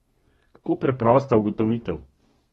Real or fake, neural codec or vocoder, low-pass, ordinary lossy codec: fake; codec, 32 kHz, 1.9 kbps, SNAC; 14.4 kHz; AAC, 32 kbps